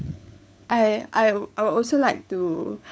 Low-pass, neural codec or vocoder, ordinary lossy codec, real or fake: none; codec, 16 kHz, 4 kbps, FunCodec, trained on LibriTTS, 50 frames a second; none; fake